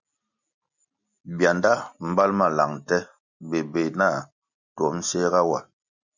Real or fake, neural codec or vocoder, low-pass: real; none; 7.2 kHz